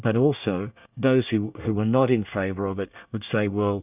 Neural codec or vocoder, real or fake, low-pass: codec, 24 kHz, 1 kbps, SNAC; fake; 3.6 kHz